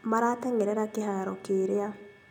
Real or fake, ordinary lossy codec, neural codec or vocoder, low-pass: real; none; none; 19.8 kHz